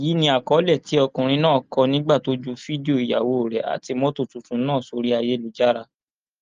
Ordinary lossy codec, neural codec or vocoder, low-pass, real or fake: Opus, 32 kbps; none; 7.2 kHz; real